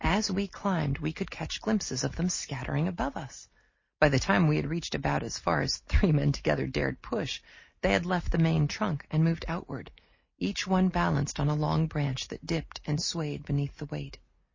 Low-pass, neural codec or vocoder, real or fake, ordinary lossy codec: 7.2 kHz; none; real; MP3, 32 kbps